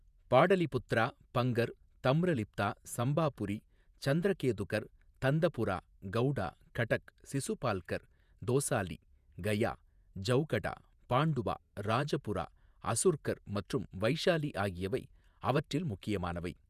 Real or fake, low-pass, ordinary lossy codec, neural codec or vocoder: fake; 14.4 kHz; none; vocoder, 48 kHz, 128 mel bands, Vocos